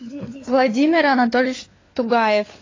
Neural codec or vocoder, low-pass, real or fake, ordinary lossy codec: codec, 16 kHz, 4 kbps, FunCodec, trained on LibriTTS, 50 frames a second; 7.2 kHz; fake; AAC, 32 kbps